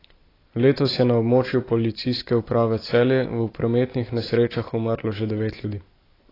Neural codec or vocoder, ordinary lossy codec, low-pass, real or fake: none; AAC, 24 kbps; 5.4 kHz; real